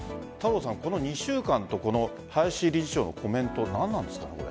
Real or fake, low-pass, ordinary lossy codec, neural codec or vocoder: real; none; none; none